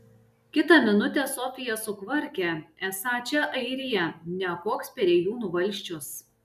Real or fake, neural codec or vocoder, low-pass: real; none; 14.4 kHz